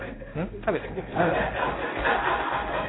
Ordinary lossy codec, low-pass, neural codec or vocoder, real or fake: AAC, 16 kbps; 7.2 kHz; codec, 16 kHz in and 24 kHz out, 1 kbps, XY-Tokenizer; fake